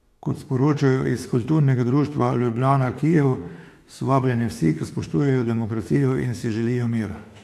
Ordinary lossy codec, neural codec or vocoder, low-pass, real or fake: AAC, 64 kbps; autoencoder, 48 kHz, 32 numbers a frame, DAC-VAE, trained on Japanese speech; 14.4 kHz; fake